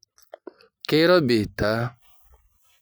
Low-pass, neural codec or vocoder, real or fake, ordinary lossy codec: none; none; real; none